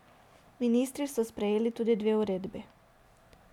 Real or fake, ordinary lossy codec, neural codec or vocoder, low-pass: real; none; none; 19.8 kHz